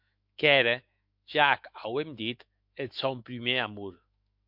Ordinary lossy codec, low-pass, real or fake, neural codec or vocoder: MP3, 48 kbps; 5.4 kHz; fake; autoencoder, 48 kHz, 128 numbers a frame, DAC-VAE, trained on Japanese speech